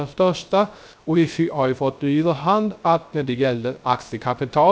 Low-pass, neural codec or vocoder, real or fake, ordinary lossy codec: none; codec, 16 kHz, 0.3 kbps, FocalCodec; fake; none